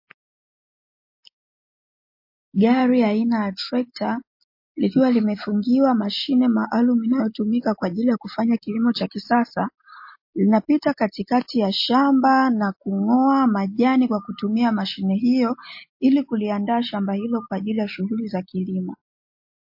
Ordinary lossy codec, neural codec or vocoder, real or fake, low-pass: MP3, 32 kbps; none; real; 5.4 kHz